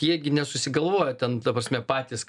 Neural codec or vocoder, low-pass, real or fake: none; 10.8 kHz; real